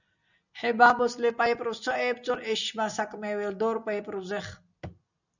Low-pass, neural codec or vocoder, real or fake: 7.2 kHz; none; real